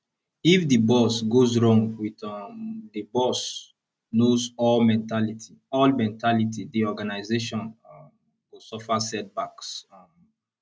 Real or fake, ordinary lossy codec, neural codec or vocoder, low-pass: real; none; none; none